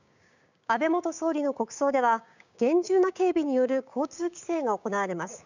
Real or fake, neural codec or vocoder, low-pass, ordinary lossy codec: fake; codec, 16 kHz, 6 kbps, DAC; 7.2 kHz; none